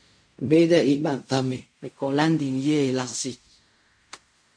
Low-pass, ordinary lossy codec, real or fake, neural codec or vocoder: 9.9 kHz; MP3, 48 kbps; fake; codec, 16 kHz in and 24 kHz out, 0.4 kbps, LongCat-Audio-Codec, fine tuned four codebook decoder